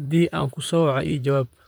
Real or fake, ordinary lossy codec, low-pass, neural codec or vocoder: fake; none; none; vocoder, 44.1 kHz, 128 mel bands, Pupu-Vocoder